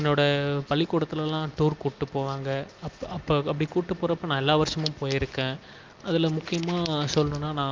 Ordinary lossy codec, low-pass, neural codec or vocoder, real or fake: Opus, 24 kbps; 7.2 kHz; none; real